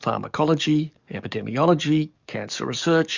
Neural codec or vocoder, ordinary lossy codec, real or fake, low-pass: codec, 44.1 kHz, 7.8 kbps, DAC; Opus, 64 kbps; fake; 7.2 kHz